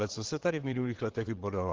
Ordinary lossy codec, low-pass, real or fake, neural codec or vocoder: Opus, 16 kbps; 7.2 kHz; fake; vocoder, 22.05 kHz, 80 mel bands, WaveNeXt